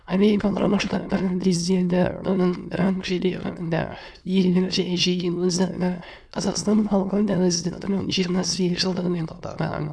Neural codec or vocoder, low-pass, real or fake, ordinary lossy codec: autoencoder, 22.05 kHz, a latent of 192 numbers a frame, VITS, trained on many speakers; none; fake; none